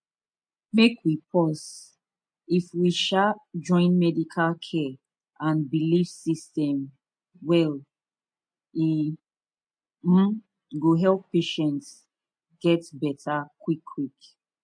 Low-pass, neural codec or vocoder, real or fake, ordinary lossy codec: 9.9 kHz; none; real; MP3, 48 kbps